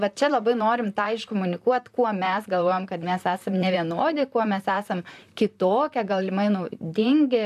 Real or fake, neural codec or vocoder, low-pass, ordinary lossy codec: fake; vocoder, 44.1 kHz, 128 mel bands, Pupu-Vocoder; 14.4 kHz; AAC, 96 kbps